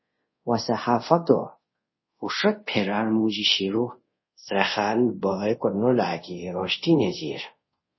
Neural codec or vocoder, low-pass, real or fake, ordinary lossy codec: codec, 24 kHz, 0.5 kbps, DualCodec; 7.2 kHz; fake; MP3, 24 kbps